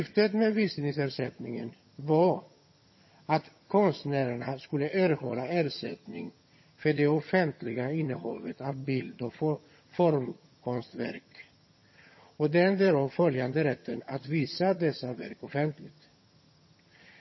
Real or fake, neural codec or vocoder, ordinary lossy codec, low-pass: fake; vocoder, 22.05 kHz, 80 mel bands, HiFi-GAN; MP3, 24 kbps; 7.2 kHz